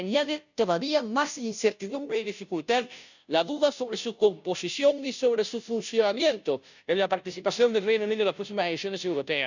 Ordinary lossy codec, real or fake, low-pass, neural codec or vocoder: none; fake; 7.2 kHz; codec, 16 kHz, 0.5 kbps, FunCodec, trained on Chinese and English, 25 frames a second